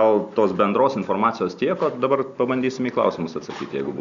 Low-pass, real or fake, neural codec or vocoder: 7.2 kHz; real; none